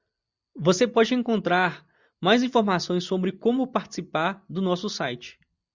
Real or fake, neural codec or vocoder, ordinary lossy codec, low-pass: real; none; Opus, 64 kbps; 7.2 kHz